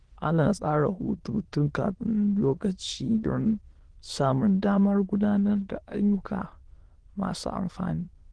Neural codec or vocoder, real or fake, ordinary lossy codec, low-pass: autoencoder, 22.05 kHz, a latent of 192 numbers a frame, VITS, trained on many speakers; fake; Opus, 16 kbps; 9.9 kHz